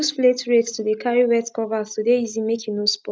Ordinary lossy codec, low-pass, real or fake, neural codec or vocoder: none; none; real; none